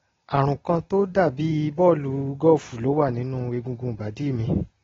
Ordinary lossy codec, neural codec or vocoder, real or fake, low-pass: AAC, 24 kbps; none; real; 7.2 kHz